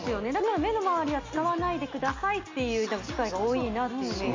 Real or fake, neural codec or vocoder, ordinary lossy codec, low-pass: real; none; MP3, 64 kbps; 7.2 kHz